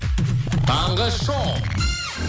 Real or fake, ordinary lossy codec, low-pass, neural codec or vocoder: real; none; none; none